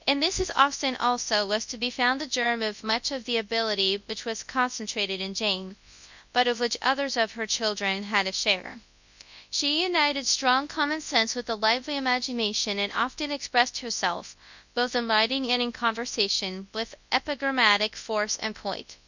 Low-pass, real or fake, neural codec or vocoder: 7.2 kHz; fake; codec, 24 kHz, 0.9 kbps, WavTokenizer, large speech release